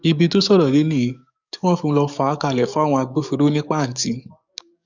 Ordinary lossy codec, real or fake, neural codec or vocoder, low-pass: none; fake; codec, 44.1 kHz, 7.8 kbps, DAC; 7.2 kHz